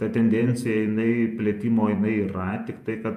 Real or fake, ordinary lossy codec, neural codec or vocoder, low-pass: fake; AAC, 96 kbps; vocoder, 48 kHz, 128 mel bands, Vocos; 14.4 kHz